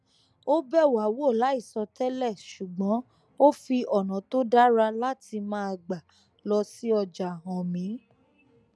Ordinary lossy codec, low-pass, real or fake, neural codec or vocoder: none; none; real; none